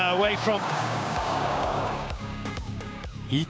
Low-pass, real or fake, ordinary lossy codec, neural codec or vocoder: none; fake; none; codec, 16 kHz, 6 kbps, DAC